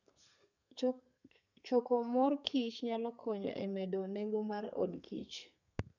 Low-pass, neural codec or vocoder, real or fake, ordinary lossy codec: 7.2 kHz; codec, 32 kHz, 1.9 kbps, SNAC; fake; none